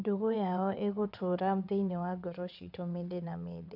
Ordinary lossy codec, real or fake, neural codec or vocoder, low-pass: none; fake; vocoder, 22.05 kHz, 80 mel bands, WaveNeXt; 5.4 kHz